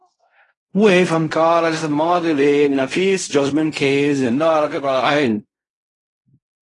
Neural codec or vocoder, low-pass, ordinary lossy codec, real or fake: codec, 16 kHz in and 24 kHz out, 0.4 kbps, LongCat-Audio-Codec, fine tuned four codebook decoder; 10.8 kHz; AAC, 32 kbps; fake